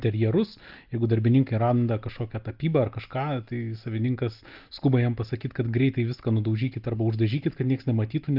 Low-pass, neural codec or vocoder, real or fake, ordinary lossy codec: 5.4 kHz; none; real; Opus, 32 kbps